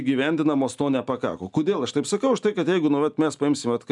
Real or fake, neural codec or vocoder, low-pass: real; none; 10.8 kHz